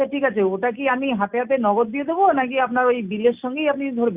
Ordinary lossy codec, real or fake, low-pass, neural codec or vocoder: Opus, 64 kbps; real; 3.6 kHz; none